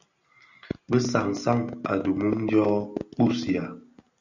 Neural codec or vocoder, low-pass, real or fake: none; 7.2 kHz; real